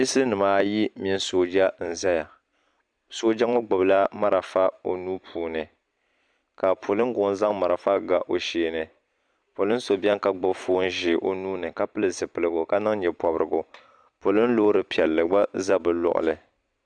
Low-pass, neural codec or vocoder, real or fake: 9.9 kHz; vocoder, 44.1 kHz, 128 mel bands every 256 samples, BigVGAN v2; fake